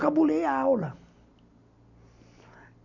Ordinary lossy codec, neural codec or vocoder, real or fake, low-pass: none; none; real; 7.2 kHz